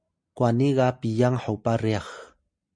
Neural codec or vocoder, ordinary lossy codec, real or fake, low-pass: none; MP3, 48 kbps; real; 9.9 kHz